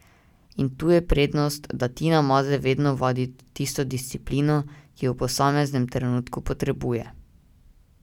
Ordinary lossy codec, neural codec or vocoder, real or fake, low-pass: none; none; real; 19.8 kHz